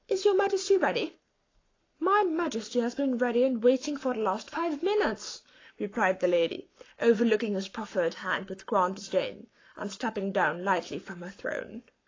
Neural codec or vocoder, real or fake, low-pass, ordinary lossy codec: codec, 44.1 kHz, 7.8 kbps, Pupu-Codec; fake; 7.2 kHz; AAC, 32 kbps